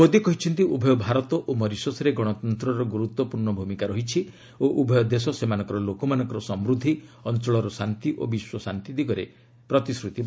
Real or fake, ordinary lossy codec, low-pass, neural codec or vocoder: real; none; none; none